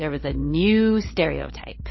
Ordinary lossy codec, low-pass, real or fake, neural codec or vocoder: MP3, 24 kbps; 7.2 kHz; fake; codec, 16 kHz, 8 kbps, FreqCodec, larger model